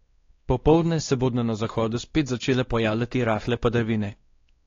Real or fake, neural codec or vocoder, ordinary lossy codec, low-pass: fake; codec, 16 kHz, 2 kbps, X-Codec, WavLM features, trained on Multilingual LibriSpeech; AAC, 32 kbps; 7.2 kHz